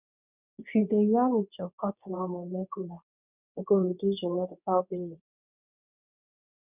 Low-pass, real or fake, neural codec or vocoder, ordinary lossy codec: 3.6 kHz; fake; codec, 24 kHz, 0.9 kbps, WavTokenizer, medium speech release version 2; none